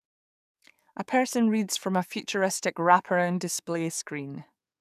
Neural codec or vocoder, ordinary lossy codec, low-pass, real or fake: codec, 44.1 kHz, 7.8 kbps, DAC; none; 14.4 kHz; fake